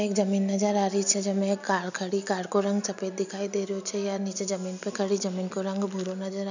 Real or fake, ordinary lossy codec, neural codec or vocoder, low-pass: real; none; none; 7.2 kHz